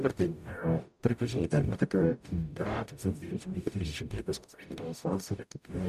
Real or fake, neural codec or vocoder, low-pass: fake; codec, 44.1 kHz, 0.9 kbps, DAC; 14.4 kHz